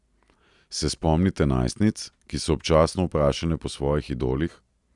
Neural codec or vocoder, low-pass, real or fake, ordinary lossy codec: none; 10.8 kHz; real; none